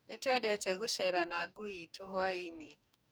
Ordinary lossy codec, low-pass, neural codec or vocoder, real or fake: none; none; codec, 44.1 kHz, 2.6 kbps, DAC; fake